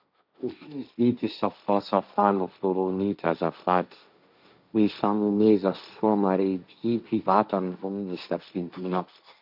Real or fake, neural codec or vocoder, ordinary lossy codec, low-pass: fake; codec, 16 kHz, 1.1 kbps, Voila-Tokenizer; none; 5.4 kHz